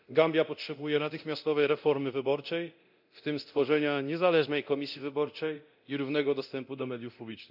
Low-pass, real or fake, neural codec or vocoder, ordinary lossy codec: 5.4 kHz; fake; codec, 24 kHz, 0.9 kbps, DualCodec; none